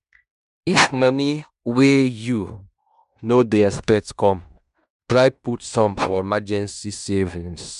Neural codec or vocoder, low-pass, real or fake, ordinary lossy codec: codec, 16 kHz in and 24 kHz out, 0.9 kbps, LongCat-Audio-Codec, fine tuned four codebook decoder; 10.8 kHz; fake; none